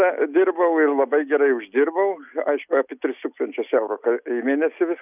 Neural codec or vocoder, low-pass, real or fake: none; 3.6 kHz; real